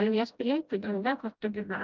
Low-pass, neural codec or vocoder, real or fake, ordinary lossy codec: 7.2 kHz; codec, 16 kHz, 0.5 kbps, FreqCodec, smaller model; fake; Opus, 24 kbps